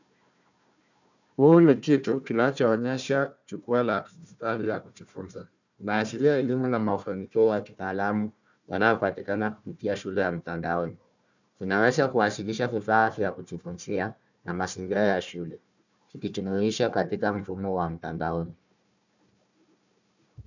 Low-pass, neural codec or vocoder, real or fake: 7.2 kHz; codec, 16 kHz, 1 kbps, FunCodec, trained on Chinese and English, 50 frames a second; fake